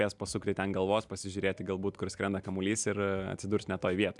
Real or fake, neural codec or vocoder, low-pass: real; none; 10.8 kHz